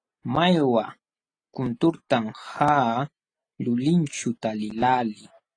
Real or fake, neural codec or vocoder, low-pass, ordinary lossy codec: real; none; 9.9 kHz; AAC, 32 kbps